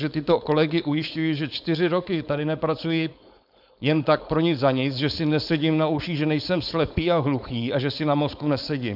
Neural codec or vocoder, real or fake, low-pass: codec, 16 kHz, 4.8 kbps, FACodec; fake; 5.4 kHz